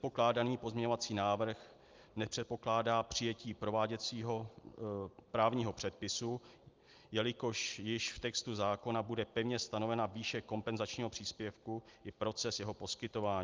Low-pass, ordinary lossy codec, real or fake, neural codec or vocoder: 7.2 kHz; Opus, 32 kbps; real; none